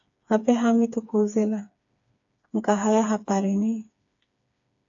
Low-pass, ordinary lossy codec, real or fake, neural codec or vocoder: 7.2 kHz; AAC, 64 kbps; fake; codec, 16 kHz, 4 kbps, FreqCodec, smaller model